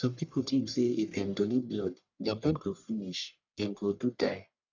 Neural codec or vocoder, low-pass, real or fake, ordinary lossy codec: codec, 44.1 kHz, 1.7 kbps, Pupu-Codec; 7.2 kHz; fake; none